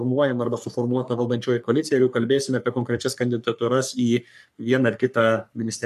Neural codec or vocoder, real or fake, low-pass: codec, 44.1 kHz, 3.4 kbps, Pupu-Codec; fake; 14.4 kHz